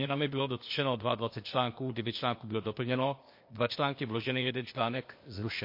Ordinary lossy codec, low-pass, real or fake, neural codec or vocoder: MP3, 32 kbps; 5.4 kHz; fake; codec, 16 kHz, 0.8 kbps, ZipCodec